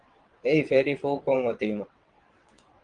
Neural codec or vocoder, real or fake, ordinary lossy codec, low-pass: vocoder, 22.05 kHz, 80 mel bands, WaveNeXt; fake; Opus, 24 kbps; 9.9 kHz